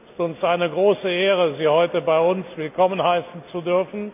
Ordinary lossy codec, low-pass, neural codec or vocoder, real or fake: none; 3.6 kHz; none; real